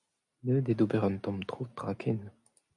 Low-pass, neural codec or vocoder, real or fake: 10.8 kHz; none; real